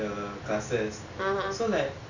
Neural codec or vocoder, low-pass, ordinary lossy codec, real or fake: none; 7.2 kHz; none; real